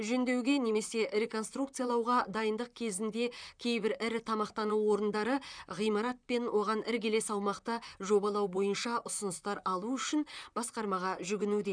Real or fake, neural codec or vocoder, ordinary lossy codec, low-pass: fake; vocoder, 44.1 kHz, 128 mel bands, Pupu-Vocoder; none; 9.9 kHz